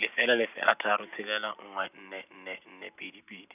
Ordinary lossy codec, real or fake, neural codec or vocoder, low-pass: none; real; none; 3.6 kHz